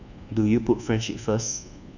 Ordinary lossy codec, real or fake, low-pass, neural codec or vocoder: none; fake; 7.2 kHz; codec, 24 kHz, 1.2 kbps, DualCodec